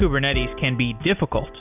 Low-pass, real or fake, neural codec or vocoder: 3.6 kHz; real; none